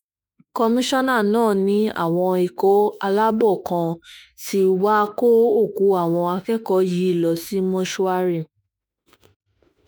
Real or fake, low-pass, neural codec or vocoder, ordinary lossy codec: fake; none; autoencoder, 48 kHz, 32 numbers a frame, DAC-VAE, trained on Japanese speech; none